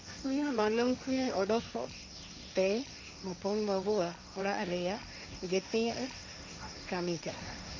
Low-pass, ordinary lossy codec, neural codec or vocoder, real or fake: none; none; codec, 16 kHz, 1.1 kbps, Voila-Tokenizer; fake